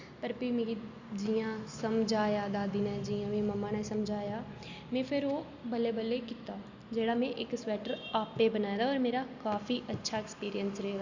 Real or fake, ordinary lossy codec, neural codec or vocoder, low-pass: real; Opus, 64 kbps; none; 7.2 kHz